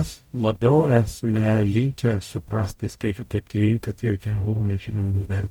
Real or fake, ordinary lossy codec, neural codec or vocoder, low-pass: fake; MP3, 96 kbps; codec, 44.1 kHz, 0.9 kbps, DAC; 19.8 kHz